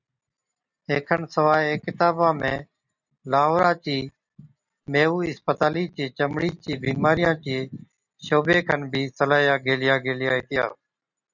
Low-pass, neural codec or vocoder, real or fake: 7.2 kHz; none; real